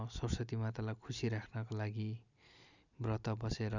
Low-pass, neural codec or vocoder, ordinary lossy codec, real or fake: 7.2 kHz; none; none; real